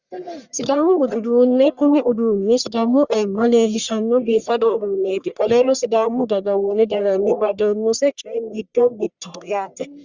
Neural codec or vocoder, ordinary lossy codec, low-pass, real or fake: codec, 44.1 kHz, 1.7 kbps, Pupu-Codec; Opus, 64 kbps; 7.2 kHz; fake